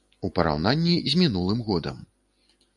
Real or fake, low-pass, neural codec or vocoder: real; 10.8 kHz; none